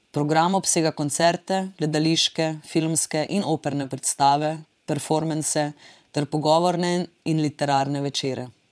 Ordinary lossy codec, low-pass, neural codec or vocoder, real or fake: none; none; vocoder, 22.05 kHz, 80 mel bands, Vocos; fake